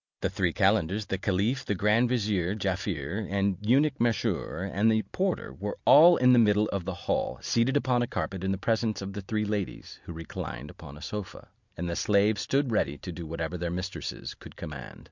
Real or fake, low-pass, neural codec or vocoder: real; 7.2 kHz; none